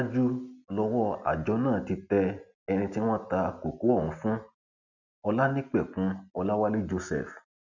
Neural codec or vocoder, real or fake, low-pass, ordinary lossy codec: none; real; 7.2 kHz; none